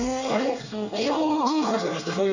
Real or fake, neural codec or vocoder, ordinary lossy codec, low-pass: fake; codec, 24 kHz, 1 kbps, SNAC; none; 7.2 kHz